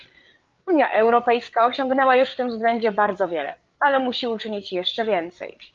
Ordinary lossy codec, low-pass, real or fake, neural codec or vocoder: Opus, 32 kbps; 7.2 kHz; fake; codec, 16 kHz, 8 kbps, FreqCodec, larger model